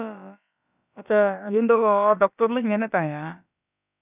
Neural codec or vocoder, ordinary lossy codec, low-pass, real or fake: codec, 16 kHz, about 1 kbps, DyCAST, with the encoder's durations; none; 3.6 kHz; fake